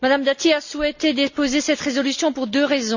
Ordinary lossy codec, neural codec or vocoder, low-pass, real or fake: none; none; 7.2 kHz; real